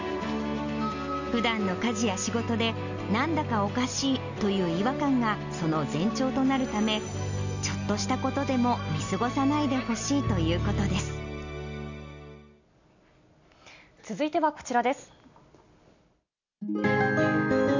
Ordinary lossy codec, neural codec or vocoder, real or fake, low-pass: none; none; real; 7.2 kHz